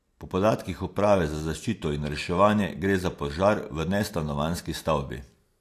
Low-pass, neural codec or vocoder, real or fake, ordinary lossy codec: 14.4 kHz; none; real; AAC, 64 kbps